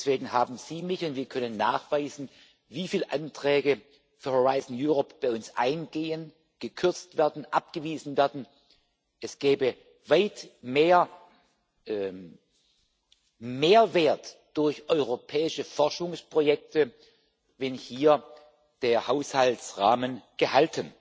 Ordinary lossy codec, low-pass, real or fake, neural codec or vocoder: none; none; real; none